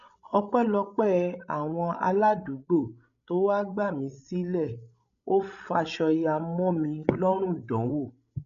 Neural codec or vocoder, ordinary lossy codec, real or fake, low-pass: codec, 16 kHz, 16 kbps, FreqCodec, larger model; none; fake; 7.2 kHz